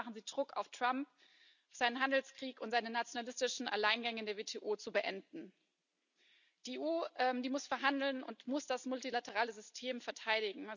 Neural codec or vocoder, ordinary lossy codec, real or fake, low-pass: none; none; real; 7.2 kHz